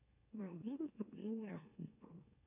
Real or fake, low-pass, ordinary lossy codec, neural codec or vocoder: fake; 3.6 kHz; MP3, 32 kbps; autoencoder, 44.1 kHz, a latent of 192 numbers a frame, MeloTTS